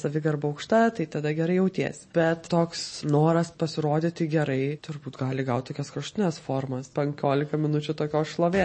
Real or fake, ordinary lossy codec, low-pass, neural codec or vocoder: real; MP3, 32 kbps; 9.9 kHz; none